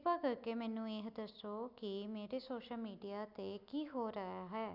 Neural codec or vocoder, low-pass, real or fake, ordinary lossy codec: none; 5.4 kHz; real; none